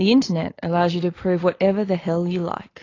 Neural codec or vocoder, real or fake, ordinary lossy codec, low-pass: none; real; AAC, 32 kbps; 7.2 kHz